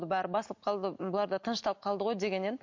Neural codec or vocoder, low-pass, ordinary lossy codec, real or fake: none; 7.2 kHz; MP3, 48 kbps; real